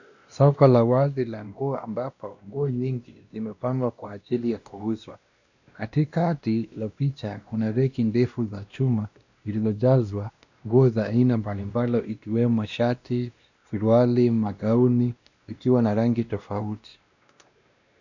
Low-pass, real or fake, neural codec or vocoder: 7.2 kHz; fake; codec, 16 kHz, 1 kbps, X-Codec, WavLM features, trained on Multilingual LibriSpeech